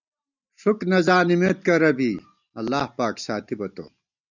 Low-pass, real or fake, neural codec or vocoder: 7.2 kHz; real; none